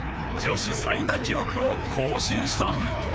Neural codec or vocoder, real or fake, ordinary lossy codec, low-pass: codec, 16 kHz, 2 kbps, FreqCodec, larger model; fake; none; none